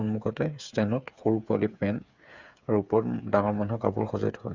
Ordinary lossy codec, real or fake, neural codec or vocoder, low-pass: Opus, 64 kbps; fake; codec, 16 kHz, 8 kbps, FreqCodec, smaller model; 7.2 kHz